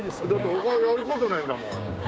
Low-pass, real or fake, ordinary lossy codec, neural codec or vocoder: none; fake; none; codec, 16 kHz, 6 kbps, DAC